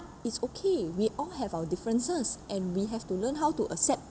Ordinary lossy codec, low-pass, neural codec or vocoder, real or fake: none; none; none; real